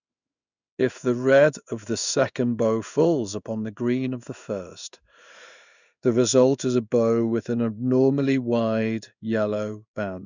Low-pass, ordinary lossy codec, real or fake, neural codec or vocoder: 7.2 kHz; none; fake; codec, 16 kHz in and 24 kHz out, 1 kbps, XY-Tokenizer